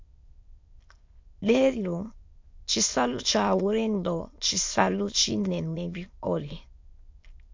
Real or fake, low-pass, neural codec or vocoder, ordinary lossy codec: fake; 7.2 kHz; autoencoder, 22.05 kHz, a latent of 192 numbers a frame, VITS, trained on many speakers; MP3, 48 kbps